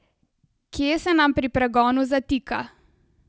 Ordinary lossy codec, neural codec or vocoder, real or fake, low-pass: none; none; real; none